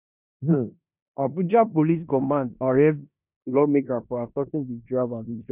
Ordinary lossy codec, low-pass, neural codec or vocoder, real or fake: none; 3.6 kHz; codec, 16 kHz in and 24 kHz out, 0.9 kbps, LongCat-Audio-Codec, four codebook decoder; fake